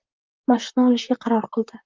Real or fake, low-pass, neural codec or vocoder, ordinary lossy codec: fake; 7.2 kHz; vocoder, 44.1 kHz, 128 mel bands, Pupu-Vocoder; Opus, 24 kbps